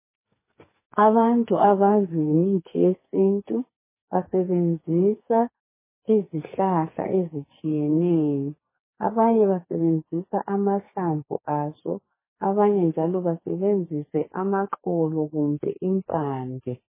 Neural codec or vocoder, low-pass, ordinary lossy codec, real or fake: codec, 44.1 kHz, 2.6 kbps, SNAC; 3.6 kHz; MP3, 16 kbps; fake